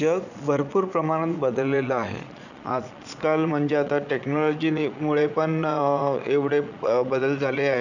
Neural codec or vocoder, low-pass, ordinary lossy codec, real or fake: vocoder, 22.05 kHz, 80 mel bands, Vocos; 7.2 kHz; none; fake